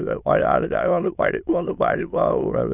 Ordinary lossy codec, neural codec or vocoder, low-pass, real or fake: none; autoencoder, 22.05 kHz, a latent of 192 numbers a frame, VITS, trained on many speakers; 3.6 kHz; fake